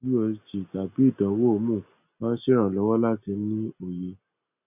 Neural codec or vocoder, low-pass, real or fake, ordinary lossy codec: none; 3.6 kHz; real; none